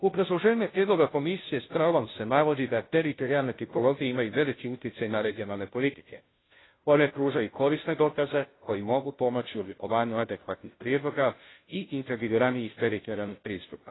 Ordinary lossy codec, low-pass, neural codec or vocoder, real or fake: AAC, 16 kbps; 7.2 kHz; codec, 16 kHz, 0.5 kbps, FunCodec, trained on Chinese and English, 25 frames a second; fake